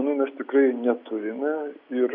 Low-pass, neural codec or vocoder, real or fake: 5.4 kHz; none; real